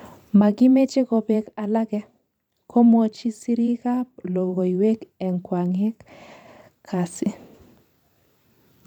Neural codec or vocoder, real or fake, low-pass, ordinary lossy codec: vocoder, 44.1 kHz, 128 mel bands every 512 samples, BigVGAN v2; fake; 19.8 kHz; none